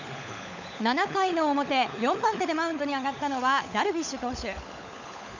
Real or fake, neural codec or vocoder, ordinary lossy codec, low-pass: fake; codec, 16 kHz, 16 kbps, FunCodec, trained on LibriTTS, 50 frames a second; none; 7.2 kHz